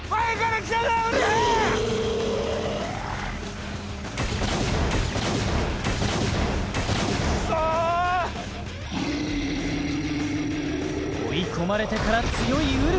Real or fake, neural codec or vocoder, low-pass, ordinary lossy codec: real; none; none; none